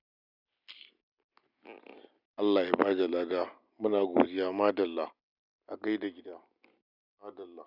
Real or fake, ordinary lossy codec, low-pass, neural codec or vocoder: real; none; 5.4 kHz; none